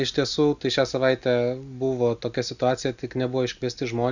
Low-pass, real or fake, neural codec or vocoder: 7.2 kHz; real; none